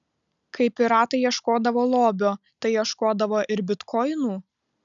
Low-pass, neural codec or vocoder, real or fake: 7.2 kHz; none; real